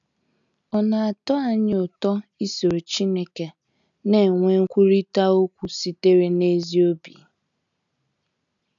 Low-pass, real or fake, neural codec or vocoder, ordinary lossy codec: 7.2 kHz; real; none; none